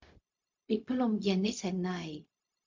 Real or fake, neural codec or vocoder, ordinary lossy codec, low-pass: fake; codec, 16 kHz, 0.4 kbps, LongCat-Audio-Codec; MP3, 48 kbps; 7.2 kHz